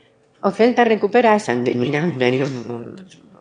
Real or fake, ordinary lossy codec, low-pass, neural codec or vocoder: fake; MP3, 64 kbps; 9.9 kHz; autoencoder, 22.05 kHz, a latent of 192 numbers a frame, VITS, trained on one speaker